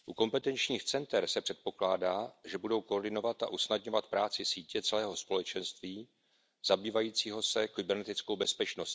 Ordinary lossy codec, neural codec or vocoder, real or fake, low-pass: none; none; real; none